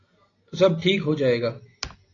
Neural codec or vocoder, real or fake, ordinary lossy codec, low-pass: none; real; AAC, 32 kbps; 7.2 kHz